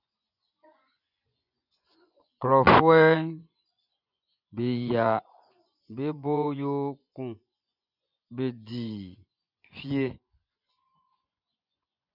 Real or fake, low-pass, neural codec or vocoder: fake; 5.4 kHz; vocoder, 24 kHz, 100 mel bands, Vocos